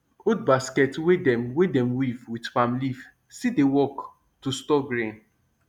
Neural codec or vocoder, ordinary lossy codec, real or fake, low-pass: none; none; real; 19.8 kHz